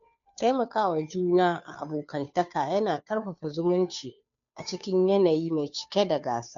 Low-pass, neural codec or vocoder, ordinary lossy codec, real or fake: 7.2 kHz; codec, 16 kHz, 2 kbps, FunCodec, trained on Chinese and English, 25 frames a second; none; fake